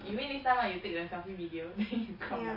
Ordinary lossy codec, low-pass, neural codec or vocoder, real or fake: MP3, 24 kbps; 5.4 kHz; none; real